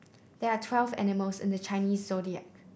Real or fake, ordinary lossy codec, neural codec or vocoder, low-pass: real; none; none; none